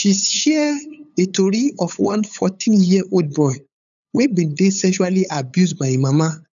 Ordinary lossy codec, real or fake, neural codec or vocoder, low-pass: none; fake; codec, 16 kHz, 8 kbps, FunCodec, trained on LibriTTS, 25 frames a second; 7.2 kHz